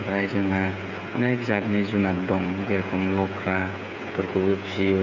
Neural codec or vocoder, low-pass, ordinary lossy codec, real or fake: codec, 16 kHz, 8 kbps, FreqCodec, smaller model; 7.2 kHz; none; fake